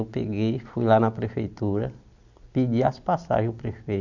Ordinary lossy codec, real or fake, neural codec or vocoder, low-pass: none; real; none; 7.2 kHz